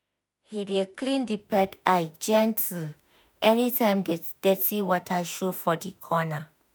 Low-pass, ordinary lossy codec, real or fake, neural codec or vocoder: none; none; fake; autoencoder, 48 kHz, 32 numbers a frame, DAC-VAE, trained on Japanese speech